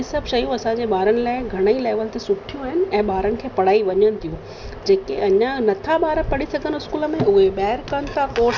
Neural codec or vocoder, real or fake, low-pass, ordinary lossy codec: none; real; 7.2 kHz; none